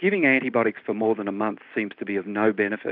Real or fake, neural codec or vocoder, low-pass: real; none; 5.4 kHz